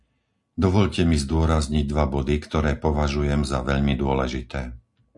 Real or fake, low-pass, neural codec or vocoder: real; 10.8 kHz; none